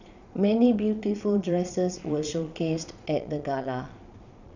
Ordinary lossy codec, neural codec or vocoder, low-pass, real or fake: none; vocoder, 22.05 kHz, 80 mel bands, Vocos; 7.2 kHz; fake